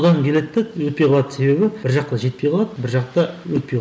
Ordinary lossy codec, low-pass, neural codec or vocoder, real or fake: none; none; none; real